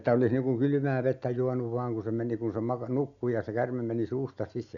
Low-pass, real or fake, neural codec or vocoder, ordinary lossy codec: 7.2 kHz; real; none; none